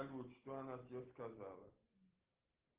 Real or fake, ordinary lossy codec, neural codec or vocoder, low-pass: real; Opus, 16 kbps; none; 3.6 kHz